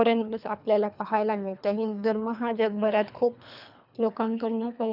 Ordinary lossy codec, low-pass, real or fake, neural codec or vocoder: none; 5.4 kHz; fake; codec, 24 kHz, 3 kbps, HILCodec